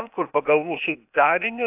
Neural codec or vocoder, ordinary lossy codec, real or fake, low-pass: codec, 16 kHz, 0.8 kbps, ZipCodec; AAC, 32 kbps; fake; 3.6 kHz